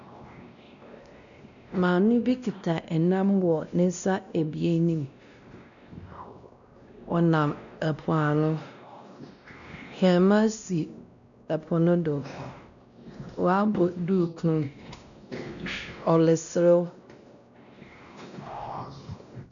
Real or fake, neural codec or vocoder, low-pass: fake; codec, 16 kHz, 1 kbps, X-Codec, WavLM features, trained on Multilingual LibriSpeech; 7.2 kHz